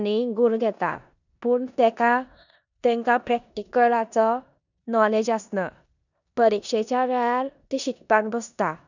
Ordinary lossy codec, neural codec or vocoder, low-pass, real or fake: none; codec, 16 kHz in and 24 kHz out, 0.9 kbps, LongCat-Audio-Codec, four codebook decoder; 7.2 kHz; fake